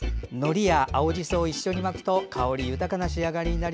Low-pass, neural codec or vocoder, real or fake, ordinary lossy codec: none; none; real; none